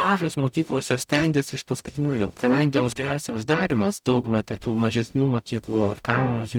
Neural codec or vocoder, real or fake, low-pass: codec, 44.1 kHz, 0.9 kbps, DAC; fake; 19.8 kHz